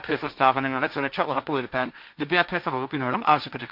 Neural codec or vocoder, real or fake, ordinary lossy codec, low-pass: codec, 16 kHz, 1.1 kbps, Voila-Tokenizer; fake; none; 5.4 kHz